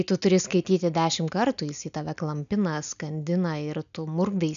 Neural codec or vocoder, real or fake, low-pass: none; real; 7.2 kHz